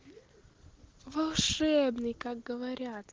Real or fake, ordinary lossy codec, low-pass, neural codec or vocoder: real; Opus, 16 kbps; 7.2 kHz; none